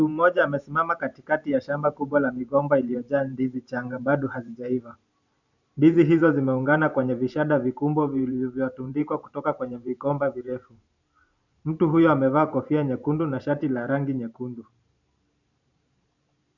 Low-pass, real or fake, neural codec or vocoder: 7.2 kHz; fake; vocoder, 44.1 kHz, 128 mel bands every 512 samples, BigVGAN v2